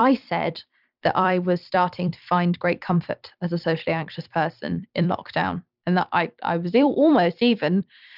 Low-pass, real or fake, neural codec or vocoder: 5.4 kHz; fake; vocoder, 44.1 kHz, 128 mel bands every 256 samples, BigVGAN v2